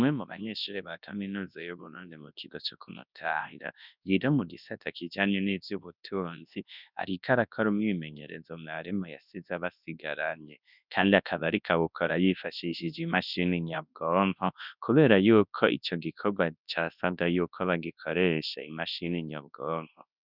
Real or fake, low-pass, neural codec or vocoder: fake; 5.4 kHz; codec, 24 kHz, 0.9 kbps, WavTokenizer, large speech release